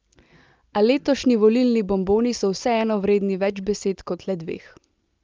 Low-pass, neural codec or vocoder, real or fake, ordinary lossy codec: 7.2 kHz; none; real; Opus, 24 kbps